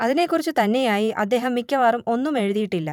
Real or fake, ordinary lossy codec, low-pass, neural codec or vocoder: fake; none; 19.8 kHz; vocoder, 44.1 kHz, 128 mel bands every 512 samples, BigVGAN v2